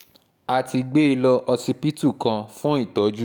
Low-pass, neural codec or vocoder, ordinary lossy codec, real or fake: 19.8 kHz; codec, 44.1 kHz, 7.8 kbps, DAC; none; fake